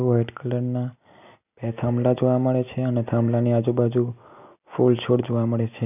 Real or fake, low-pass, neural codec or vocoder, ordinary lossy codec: real; 3.6 kHz; none; none